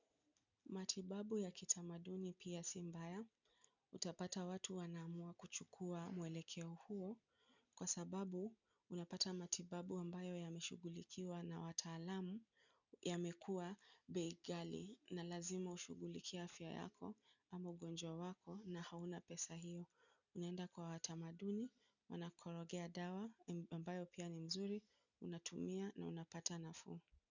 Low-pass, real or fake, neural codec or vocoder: 7.2 kHz; real; none